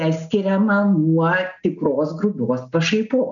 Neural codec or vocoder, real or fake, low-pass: none; real; 7.2 kHz